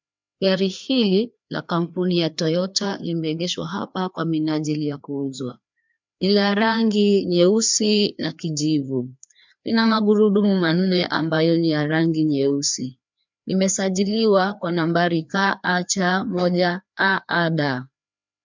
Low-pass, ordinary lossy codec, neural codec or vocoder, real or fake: 7.2 kHz; MP3, 64 kbps; codec, 16 kHz, 2 kbps, FreqCodec, larger model; fake